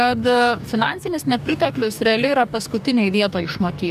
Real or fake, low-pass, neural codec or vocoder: fake; 14.4 kHz; codec, 44.1 kHz, 2.6 kbps, DAC